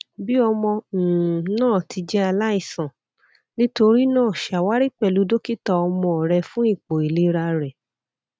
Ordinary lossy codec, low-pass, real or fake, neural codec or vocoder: none; none; real; none